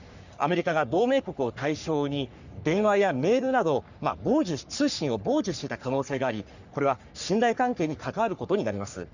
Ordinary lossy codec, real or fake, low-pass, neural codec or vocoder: none; fake; 7.2 kHz; codec, 44.1 kHz, 3.4 kbps, Pupu-Codec